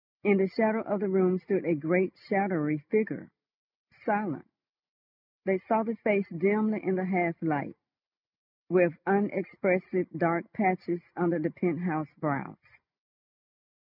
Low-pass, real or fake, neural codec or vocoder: 5.4 kHz; real; none